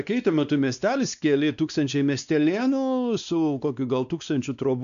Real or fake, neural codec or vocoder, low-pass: fake; codec, 16 kHz, 2 kbps, X-Codec, WavLM features, trained on Multilingual LibriSpeech; 7.2 kHz